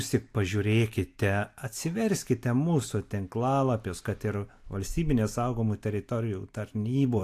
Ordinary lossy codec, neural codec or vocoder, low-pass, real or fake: AAC, 64 kbps; none; 14.4 kHz; real